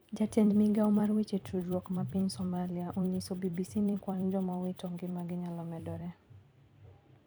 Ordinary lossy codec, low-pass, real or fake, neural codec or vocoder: none; none; fake; vocoder, 44.1 kHz, 128 mel bands every 256 samples, BigVGAN v2